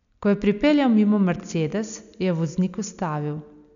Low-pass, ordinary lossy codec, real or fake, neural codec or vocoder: 7.2 kHz; none; real; none